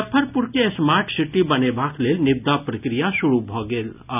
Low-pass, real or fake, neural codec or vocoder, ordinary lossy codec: 3.6 kHz; real; none; none